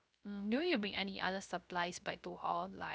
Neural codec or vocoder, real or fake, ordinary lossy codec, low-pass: codec, 16 kHz, 0.3 kbps, FocalCodec; fake; none; none